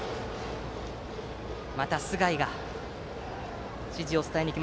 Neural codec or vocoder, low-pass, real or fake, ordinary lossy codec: none; none; real; none